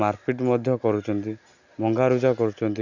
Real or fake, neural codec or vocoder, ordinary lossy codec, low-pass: real; none; none; 7.2 kHz